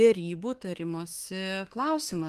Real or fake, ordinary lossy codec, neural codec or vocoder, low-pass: fake; Opus, 24 kbps; autoencoder, 48 kHz, 32 numbers a frame, DAC-VAE, trained on Japanese speech; 14.4 kHz